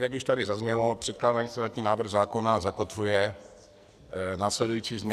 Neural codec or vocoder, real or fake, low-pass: codec, 44.1 kHz, 2.6 kbps, SNAC; fake; 14.4 kHz